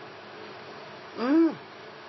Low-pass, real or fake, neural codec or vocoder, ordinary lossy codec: 7.2 kHz; real; none; MP3, 24 kbps